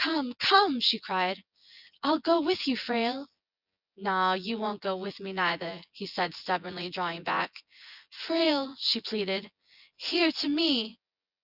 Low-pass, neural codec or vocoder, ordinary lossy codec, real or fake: 5.4 kHz; vocoder, 24 kHz, 100 mel bands, Vocos; Opus, 64 kbps; fake